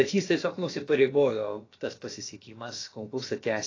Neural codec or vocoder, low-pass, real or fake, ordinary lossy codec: codec, 16 kHz, about 1 kbps, DyCAST, with the encoder's durations; 7.2 kHz; fake; AAC, 32 kbps